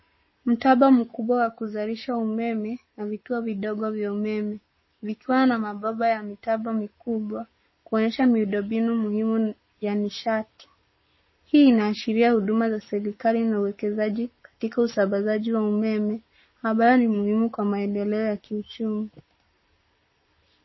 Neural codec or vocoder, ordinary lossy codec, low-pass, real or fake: codec, 44.1 kHz, 7.8 kbps, Pupu-Codec; MP3, 24 kbps; 7.2 kHz; fake